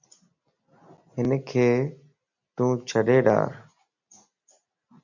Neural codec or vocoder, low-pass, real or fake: none; 7.2 kHz; real